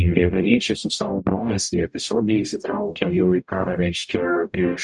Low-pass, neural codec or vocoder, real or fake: 10.8 kHz; codec, 44.1 kHz, 0.9 kbps, DAC; fake